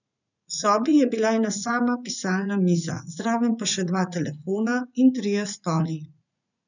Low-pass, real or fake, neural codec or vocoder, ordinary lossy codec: 7.2 kHz; fake; vocoder, 44.1 kHz, 80 mel bands, Vocos; none